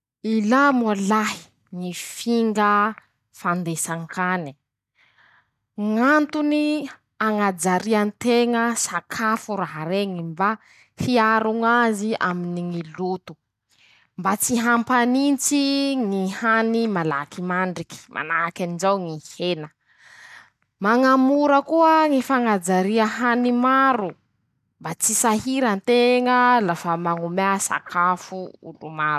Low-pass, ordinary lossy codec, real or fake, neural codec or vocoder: 14.4 kHz; none; real; none